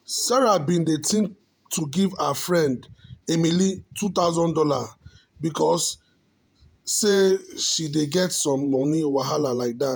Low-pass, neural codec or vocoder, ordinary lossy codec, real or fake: none; vocoder, 48 kHz, 128 mel bands, Vocos; none; fake